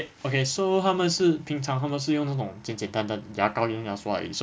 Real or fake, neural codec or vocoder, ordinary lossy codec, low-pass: real; none; none; none